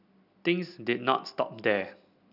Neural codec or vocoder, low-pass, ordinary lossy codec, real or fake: none; 5.4 kHz; none; real